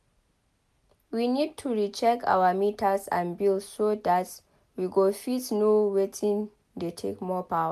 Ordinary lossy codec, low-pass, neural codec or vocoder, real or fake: MP3, 96 kbps; 14.4 kHz; none; real